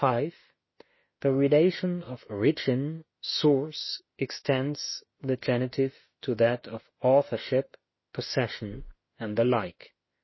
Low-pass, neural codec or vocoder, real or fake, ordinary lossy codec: 7.2 kHz; autoencoder, 48 kHz, 32 numbers a frame, DAC-VAE, trained on Japanese speech; fake; MP3, 24 kbps